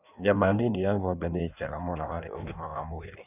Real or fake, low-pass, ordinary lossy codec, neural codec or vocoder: fake; 3.6 kHz; none; codec, 16 kHz in and 24 kHz out, 1.1 kbps, FireRedTTS-2 codec